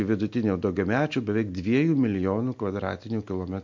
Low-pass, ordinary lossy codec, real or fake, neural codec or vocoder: 7.2 kHz; MP3, 48 kbps; real; none